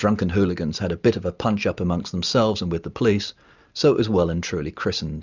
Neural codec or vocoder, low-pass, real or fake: none; 7.2 kHz; real